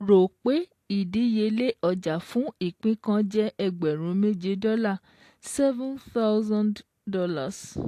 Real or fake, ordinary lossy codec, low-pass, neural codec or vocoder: real; AAC, 64 kbps; 14.4 kHz; none